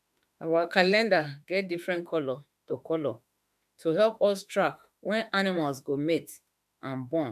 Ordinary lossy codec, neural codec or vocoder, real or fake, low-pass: none; autoencoder, 48 kHz, 32 numbers a frame, DAC-VAE, trained on Japanese speech; fake; 14.4 kHz